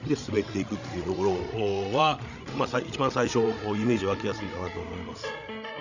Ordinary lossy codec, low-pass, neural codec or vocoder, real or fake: none; 7.2 kHz; codec, 16 kHz, 16 kbps, FreqCodec, larger model; fake